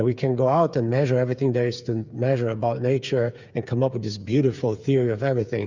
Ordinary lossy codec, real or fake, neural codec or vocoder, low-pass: Opus, 64 kbps; fake; codec, 16 kHz, 8 kbps, FreqCodec, smaller model; 7.2 kHz